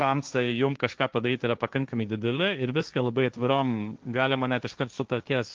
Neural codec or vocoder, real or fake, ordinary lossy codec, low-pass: codec, 16 kHz, 1.1 kbps, Voila-Tokenizer; fake; Opus, 24 kbps; 7.2 kHz